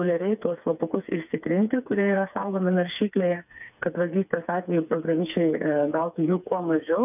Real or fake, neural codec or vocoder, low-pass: fake; codec, 16 kHz, 4 kbps, FreqCodec, smaller model; 3.6 kHz